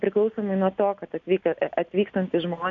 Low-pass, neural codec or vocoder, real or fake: 7.2 kHz; none; real